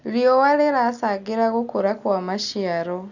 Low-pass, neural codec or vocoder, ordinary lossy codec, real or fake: 7.2 kHz; none; none; real